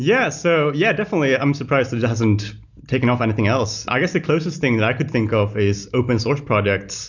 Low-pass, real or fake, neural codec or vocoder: 7.2 kHz; real; none